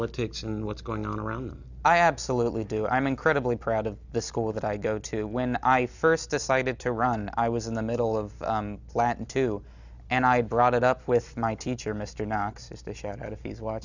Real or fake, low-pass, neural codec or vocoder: real; 7.2 kHz; none